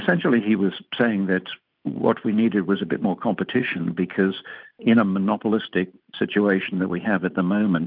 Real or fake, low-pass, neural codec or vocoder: real; 5.4 kHz; none